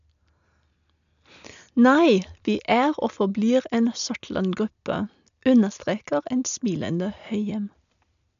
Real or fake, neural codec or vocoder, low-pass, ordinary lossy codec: real; none; 7.2 kHz; none